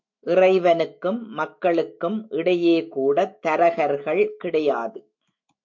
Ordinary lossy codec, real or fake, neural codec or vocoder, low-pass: MP3, 48 kbps; fake; autoencoder, 48 kHz, 128 numbers a frame, DAC-VAE, trained on Japanese speech; 7.2 kHz